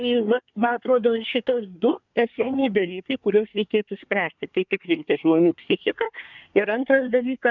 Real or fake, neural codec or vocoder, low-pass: fake; codec, 24 kHz, 1 kbps, SNAC; 7.2 kHz